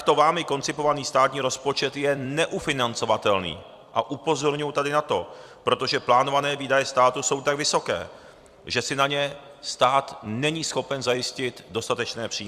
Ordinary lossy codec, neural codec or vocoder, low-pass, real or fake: Opus, 64 kbps; vocoder, 44.1 kHz, 128 mel bands every 256 samples, BigVGAN v2; 14.4 kHz; fake